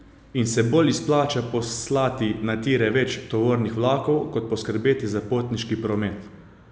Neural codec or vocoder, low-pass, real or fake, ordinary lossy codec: none; none; real; none